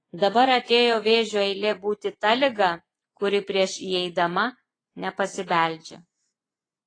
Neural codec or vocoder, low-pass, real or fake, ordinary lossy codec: vocoder, 24 kHz, 100 mel bands, Vocos; 9.9 kHz; fake; AAC, 32 kbps